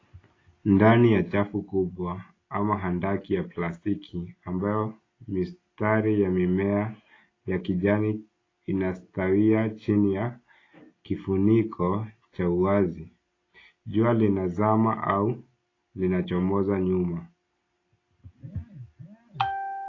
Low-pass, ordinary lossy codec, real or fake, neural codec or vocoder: 7.2 kHz; AAC, 32 kbps; real; none